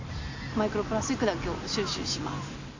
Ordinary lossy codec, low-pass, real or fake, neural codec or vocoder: AAC, 48 kbps; 7.2 kHz; real; none